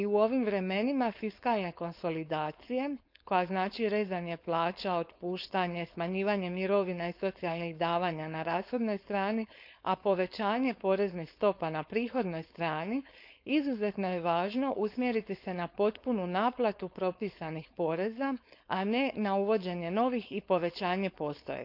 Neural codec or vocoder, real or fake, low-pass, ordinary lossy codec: codec, 16 kHz, 4.8 kbps, FACodec; fake; 5.4 kHz; none